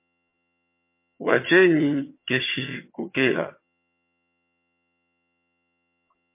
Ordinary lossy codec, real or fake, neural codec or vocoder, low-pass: MP3, 24 kbps; fake; vocoder, 22.05 kHz, 80 mel bands, HiFi-GAN; 3.6 kHz